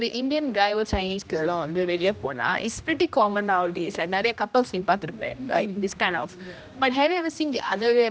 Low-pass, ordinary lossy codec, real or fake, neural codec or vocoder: none; none; fake; codec, 16 kHz, 1 kbps, X-Codec, HuBERT features, trained on general audio